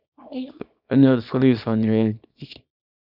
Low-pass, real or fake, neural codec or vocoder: 5.4 kHz; fake; codec, 24 kHz, 0.9 kbps, WavTokenizer, small release